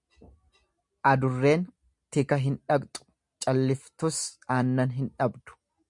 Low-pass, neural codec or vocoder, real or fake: 10.8 kHz; none; real